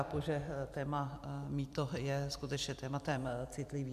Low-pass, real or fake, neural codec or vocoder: 14.4 kHz; fake; vocoder, 44.1 kHz, 128 mel bands every 256 samples, BigVGAN v2